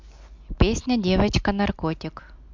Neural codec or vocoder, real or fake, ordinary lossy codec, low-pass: none; real; MP3, 64 kbps; 7.2 kHz